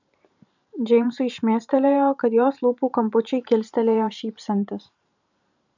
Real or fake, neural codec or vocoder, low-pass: fake; vocoder, 44.1 kHz, 128 mel bands every 512 samples, BigVGAN v2; 7.2 kHz